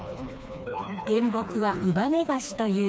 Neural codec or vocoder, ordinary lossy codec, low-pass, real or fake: codec, 16 kHz, 2 kbps, FreqCodec, smaller model; none; none; fake